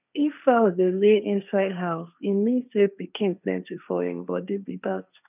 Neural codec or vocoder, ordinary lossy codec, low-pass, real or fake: codec, 16 kHz, 1.1 kbps, Voila-Tokenizer; none; 3.6 kHz; fake